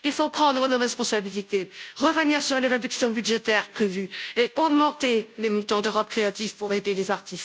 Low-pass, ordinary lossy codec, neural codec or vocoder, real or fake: none; none; codec, 16 kHz, 0.5 kbps, FunCodec, trained on Chinese and English, 25 frames a second; fake